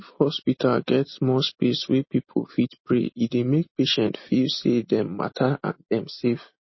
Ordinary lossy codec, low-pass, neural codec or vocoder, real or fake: MP3, 24 kbps; 7.2 kHz; none; real